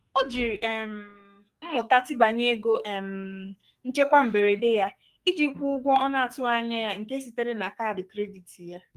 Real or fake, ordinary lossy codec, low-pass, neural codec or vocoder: fake; Opus, 32 kbps; 14.4 kHz; codec, 44.1 kHz, 2.6 kbps, SNAC